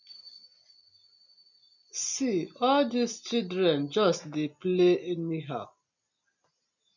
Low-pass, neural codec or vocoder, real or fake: 7.2 kHz; none; real